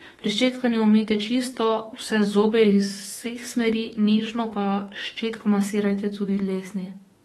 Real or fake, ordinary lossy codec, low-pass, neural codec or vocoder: fake; AAC, 32 kbps; 19.8 kHz; autoencoder, 48 kHz, 32 numbers a frame, DAC-VAE, trained on Japanese speech